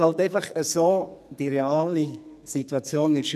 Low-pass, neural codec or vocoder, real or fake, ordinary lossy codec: 14.4 kHz; codec, 44.1 kHz, 2.6 kbps, SNAC; fake; none